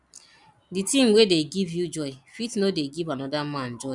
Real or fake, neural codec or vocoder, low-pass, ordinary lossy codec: real; none; 10.8 kHz; none